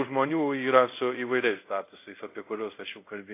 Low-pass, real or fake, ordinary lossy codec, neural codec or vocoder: 3.6 kHz; fake; AAC, 24 kbps; codec, 24 kHz, 0.5 kbps, DualCodec